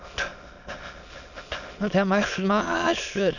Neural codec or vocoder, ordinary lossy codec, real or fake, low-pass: autoencoder, 22.05 kHz, a latent of 192 numbers a frame, VITS, trained on many speakers; none; fake; 7.2 kHz